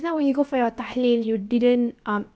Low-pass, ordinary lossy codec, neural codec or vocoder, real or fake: none; none; codec, 16 kHz, about 1 kbps, DyCAST, with the encoder's durations; fake